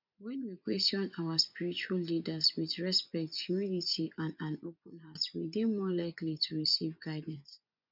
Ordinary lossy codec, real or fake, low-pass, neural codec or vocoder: none; fake; 5.4 kHz; vocoder, 24 kHz, 100 mel bands, Vocos